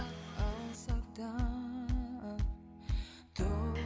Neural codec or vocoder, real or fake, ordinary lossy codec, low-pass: none; real; none; none